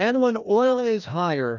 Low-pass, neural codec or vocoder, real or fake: 7.2 kHz; codec, 16 kHz, 1 kbps, FreqCodec, larger model; fake